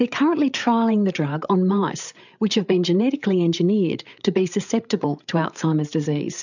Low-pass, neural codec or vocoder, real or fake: 7.2 kHz; codec, 16 kHz, 8 kbps, FreqCodec, larger model; fake